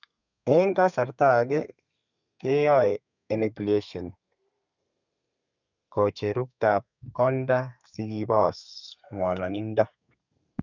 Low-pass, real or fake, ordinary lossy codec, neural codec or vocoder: 7.2 kHz; fake; none; codec, 32 kHz, 1.9 kbps, SNAC